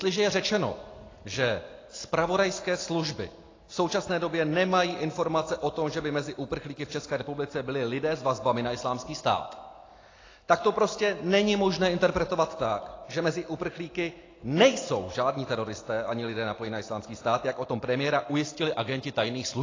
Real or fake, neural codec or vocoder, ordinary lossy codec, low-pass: real; none; AAC, 32 kbps; 7.2 kHz